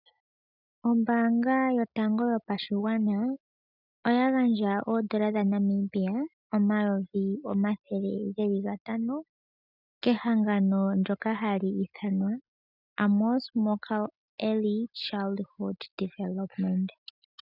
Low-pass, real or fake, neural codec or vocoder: 5.4 kHz; real; none